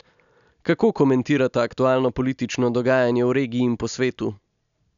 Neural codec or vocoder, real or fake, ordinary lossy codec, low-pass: none; real; none; 7.2 kHz